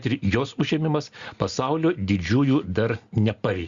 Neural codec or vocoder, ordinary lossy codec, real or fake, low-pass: none; Opus, 64 kbps; real; 7.2 kHz